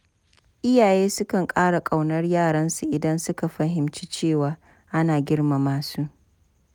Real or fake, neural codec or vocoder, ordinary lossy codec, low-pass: real; none; none; none